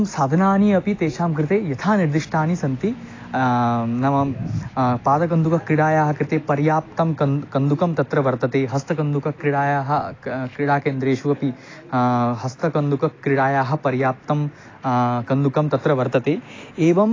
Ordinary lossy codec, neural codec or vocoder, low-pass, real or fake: AAC, 32 kbps; none; 7.2 kHz; real